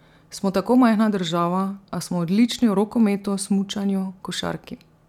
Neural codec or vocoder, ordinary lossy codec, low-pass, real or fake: none; none; 19.8 kHz; real